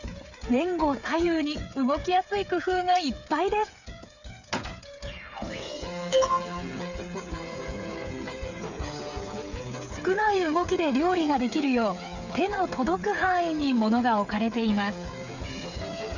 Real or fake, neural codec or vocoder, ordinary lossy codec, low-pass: fake; codec, 16 kHz, 8 kbps, FreqCodec, smaller model; none; 7.2 kHz